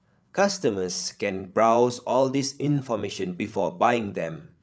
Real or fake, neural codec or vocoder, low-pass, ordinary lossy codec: fake; codec, 16 kHz, 8 kbps, FreqCodec, larger model; none; none